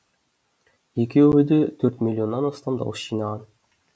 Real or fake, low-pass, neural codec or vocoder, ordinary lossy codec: real; none; none; none